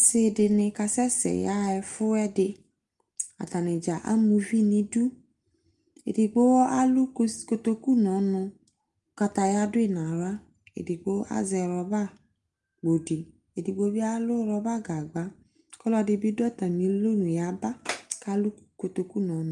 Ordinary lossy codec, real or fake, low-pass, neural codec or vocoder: Opus, 32 kbps; real; 10.8 kHz; none